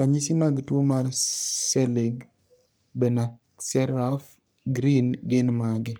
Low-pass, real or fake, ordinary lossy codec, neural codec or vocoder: none; fake; none; codec, 44.1 kHz, 3.4 kbps, Pupu-Codec